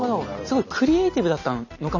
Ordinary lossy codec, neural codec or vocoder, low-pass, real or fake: none; none; 7.2 kHz; real